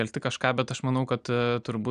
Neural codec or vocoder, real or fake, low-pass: none; real; 9.9 kHz